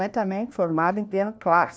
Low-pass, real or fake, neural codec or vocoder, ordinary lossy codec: none; fake; codec, 16 kHz, 1 kbps, FunCodec, trained on LibriTTS, 50 frames a second; none